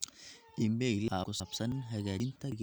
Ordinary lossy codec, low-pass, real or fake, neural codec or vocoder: none; none; real; none